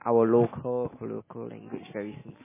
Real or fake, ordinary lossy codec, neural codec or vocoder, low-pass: real; MP3, 16 kbps; none; 3.6 kHz